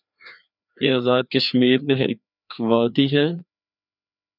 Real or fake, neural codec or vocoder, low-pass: fake; codec, 16 kHz, 2 kbps, FreqCodec, larger model; 5.4 kHz